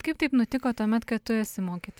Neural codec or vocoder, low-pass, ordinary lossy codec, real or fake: none; 19.8 kHz; MP3, 96 kbps; real